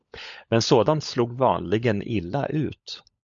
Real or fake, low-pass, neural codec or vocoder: fake; 7.2 kHz; codec, 16 kHz, 16 kbps, FunCodec, trained on LibriTTS, 50 frames a second